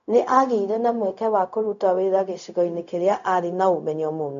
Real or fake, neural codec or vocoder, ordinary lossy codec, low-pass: fake; codec, 16 kHz, 0.4 kbps, LongCat-Audio-Codec; none; 7.2 kHz